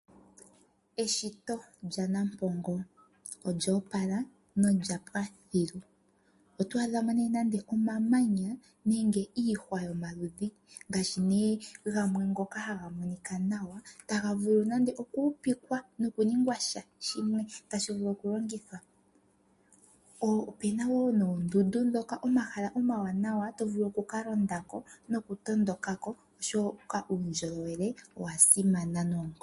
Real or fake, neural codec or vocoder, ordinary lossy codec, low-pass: real; none; MP3, 48 kbps; 14.4 kHz